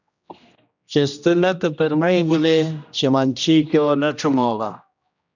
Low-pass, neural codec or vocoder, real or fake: 7.2 kHz; codec, 16 kHz, 1 kbps, X-Codec, HuBERT features, trained on general audio; fake